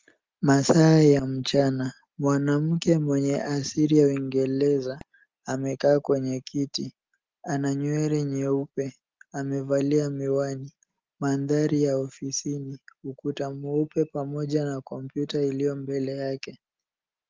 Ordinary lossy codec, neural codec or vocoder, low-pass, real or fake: Opus, 24 kbps; none; 7.2 kHz; real